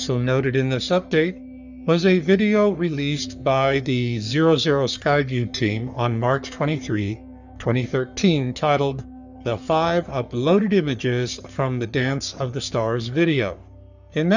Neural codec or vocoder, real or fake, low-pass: codec, 44.1 kHz, 3.4 kbps, Pupu-Codec; fake; 7.2 kHz